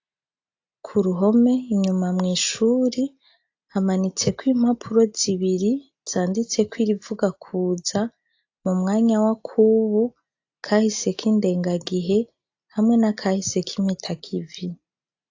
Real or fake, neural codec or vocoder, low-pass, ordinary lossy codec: real; none; 7.2 kHz; AAC, 48 kbps